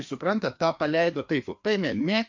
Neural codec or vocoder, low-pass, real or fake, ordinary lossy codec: codec, 16 kHz, 2 kbps, FreqCodec, larger model; 7.2 kHz; fake; MP3, 48 kbps